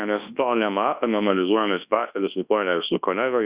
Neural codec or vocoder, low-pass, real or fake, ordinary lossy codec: codec, 24 kHz, 0.9 kbps, WavTokenizer, large speech release; 3.6 kHz; fake; Opus, 64 kbps